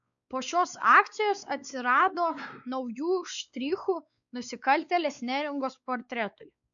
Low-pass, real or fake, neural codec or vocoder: 7.2 kHz; fake; codec, 16 kHz, 4 kbps, X-Codec, WavLM features, trained on Multilingual LibriSpeech